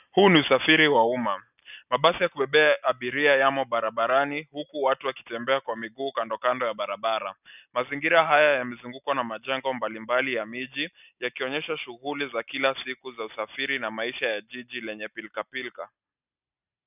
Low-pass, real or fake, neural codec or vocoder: 3.6 kHz; real; none